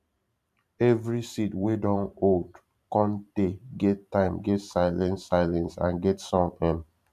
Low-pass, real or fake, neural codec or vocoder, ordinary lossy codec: 14.4 kHz; fake; vocoder, 44.1 kHz, 128 mel bands every 512 samples, BigVGAN v2; none